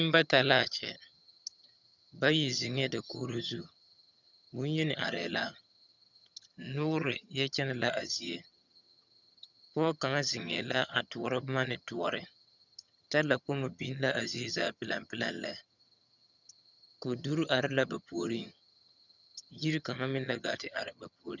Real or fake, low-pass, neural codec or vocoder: fake; 7.2 kHz; vocoder, 22.05 kHz, 80 mel bands, HiFi-GAN